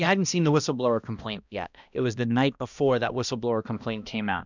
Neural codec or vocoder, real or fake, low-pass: codec, 16 kHz, 1 kbps, X-Codec, HuBERT features, trained on balanced general audio; fake; 7.2 kHz